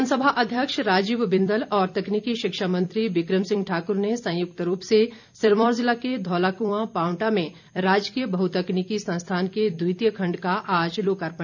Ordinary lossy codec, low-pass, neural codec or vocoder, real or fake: none; 7.2 kHz; vocoder, 44.1 kHz, 128 mel bands every 256 samples, BigVGAN v2; fake